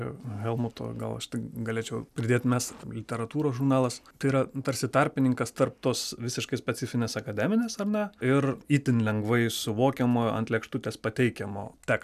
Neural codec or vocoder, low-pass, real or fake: none; 14.4 kHz; real